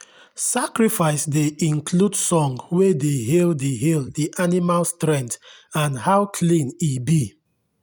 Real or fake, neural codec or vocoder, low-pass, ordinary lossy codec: real; none; none; none